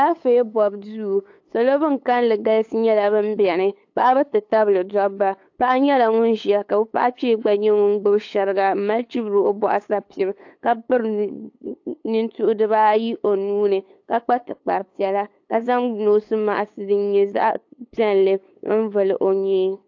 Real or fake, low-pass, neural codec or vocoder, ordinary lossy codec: fake; 7.2 kHz; codec, 16 kHz, 8 kbps, FunCodec, trained on LibriTTS, 25 frames a second; AAC, 48 kbps